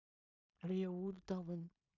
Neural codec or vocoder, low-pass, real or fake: codec, 16 kHz in and 24 kHz out, 0.4 kbps, LongCat-Audio-Codec, two codebook decoder; 7.2 kHz; fake